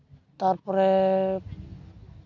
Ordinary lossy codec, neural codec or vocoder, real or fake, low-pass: none; none; real; 7.2 kHz